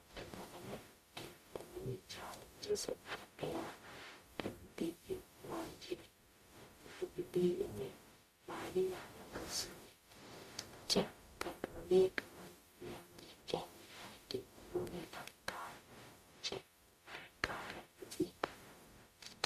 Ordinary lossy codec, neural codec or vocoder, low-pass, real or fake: MP3, 64 kbps; codec, 44.1 kHz, 0.9 kbps, DAC; 14.4 kHz; fake